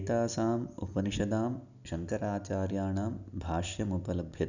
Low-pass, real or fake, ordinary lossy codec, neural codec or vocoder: 7.2 kHz; real; none; none